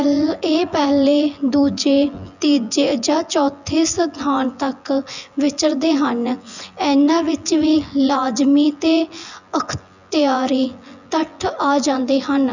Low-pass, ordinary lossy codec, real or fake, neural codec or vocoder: 7.2 kHz; none; fake; vocoder, 24 kHz, 100 mel bands, Vocos